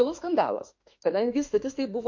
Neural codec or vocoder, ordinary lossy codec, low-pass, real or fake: autoencoder, 48 kHz, 32 numbers a frame, DAC-VAE, trained on Japanese speech; MP3, 48 kbps; 7.2 kHz; fake